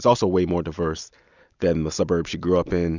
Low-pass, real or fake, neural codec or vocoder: 7.2 kHz; real; none